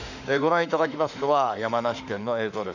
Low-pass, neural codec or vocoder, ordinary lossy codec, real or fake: 7.2 kHz; autoencoder, 48 kHz, 32 numbers a frame, DAC-VAE, trained on Japanese speech; none; fake